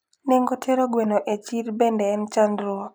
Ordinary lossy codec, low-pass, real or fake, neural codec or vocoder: none; none; real; none